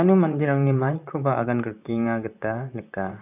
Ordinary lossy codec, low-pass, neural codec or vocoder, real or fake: none; 3.6 kHz; vocoder, 44.1 kHz, 128 mel bands, Pupu-Vocoder; fake